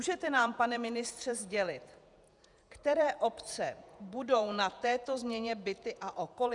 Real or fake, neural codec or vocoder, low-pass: fake; vocoder, 48 kHz, 128 mel bands, Vocos; 10.8 kHz